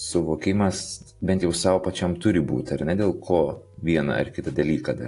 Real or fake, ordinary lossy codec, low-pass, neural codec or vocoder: real; AAC, 48 kbps; 10.8 kHz; none